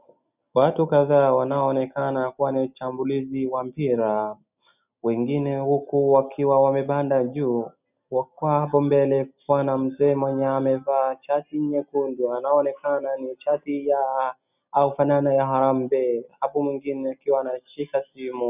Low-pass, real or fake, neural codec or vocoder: 3.6 kHz; real; none